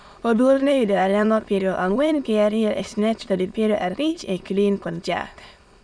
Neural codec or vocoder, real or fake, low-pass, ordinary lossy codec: autoencoder, 22.05 kHz, a latent of 192 numbers a frame, VITS, trained on many speakers; fake; none; none